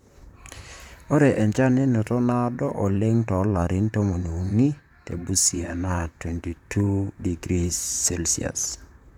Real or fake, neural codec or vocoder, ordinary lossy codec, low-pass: fake; vocoder, 44.1 kHz, 128 mel bands, Pupu-Vocoder; none; 19.8 kHz